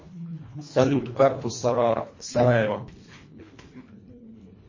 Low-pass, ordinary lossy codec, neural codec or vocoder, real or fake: 7.2 kHz; MP3, 32 kbps; codec, 24 kHz, 1.5 kbps, HILCodec; fake